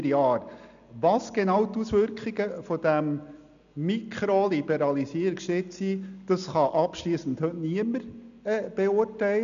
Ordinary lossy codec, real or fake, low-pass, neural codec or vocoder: none; real; 7.2 kHz; none